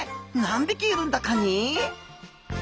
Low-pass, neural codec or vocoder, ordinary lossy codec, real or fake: none; none; none; real